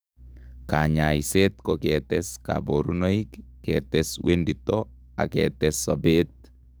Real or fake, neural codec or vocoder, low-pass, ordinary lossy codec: fake; codec, 44.1 kHz, 7.8 kbps, DAC; none; none